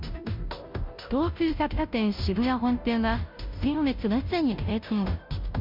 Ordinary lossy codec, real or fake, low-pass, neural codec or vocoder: MP3, 48 kbps; fake; 5.4 kHz; codec, 16 kHz, 0.5 kbps, FunCodec, trained on Chinese and English, 25 frames a second